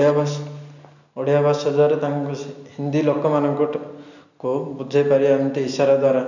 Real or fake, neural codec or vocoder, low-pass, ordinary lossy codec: real; none; 7.2 kHz; none